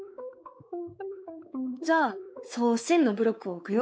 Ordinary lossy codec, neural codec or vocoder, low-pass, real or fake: none; codec, 16 kHz, 4 kbps, X-Codec, WavLM features, trained on Multilingual LibriSpeech; none; fake